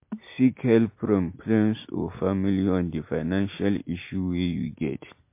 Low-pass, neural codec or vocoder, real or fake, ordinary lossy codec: 3.6 kHz; none; real; MP3, 24 kbps